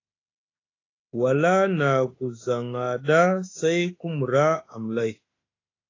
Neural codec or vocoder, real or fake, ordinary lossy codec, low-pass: autoencoder, 48 kHz, 32 numbers a frame, DAC-VAE, trained on Japanese speech; fake; AAC, 32 kbps; 7.2 kHz